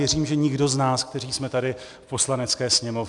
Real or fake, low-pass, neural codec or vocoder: real; 10.8 kHz; none